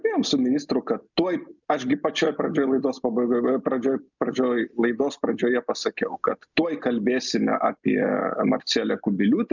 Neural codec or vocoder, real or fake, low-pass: none; real; 7.2 kHz